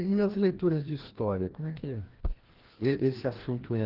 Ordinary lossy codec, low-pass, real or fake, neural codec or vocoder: Opus, 16 kbps; 5.4 kHz; fake; codec, 16 kHz, 1 kbps, FreqCodec, larger model